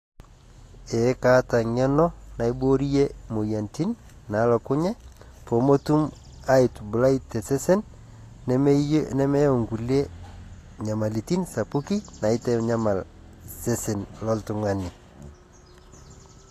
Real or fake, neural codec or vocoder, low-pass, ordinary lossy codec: real; none; 14.4 kHz; AAC, 48 kbps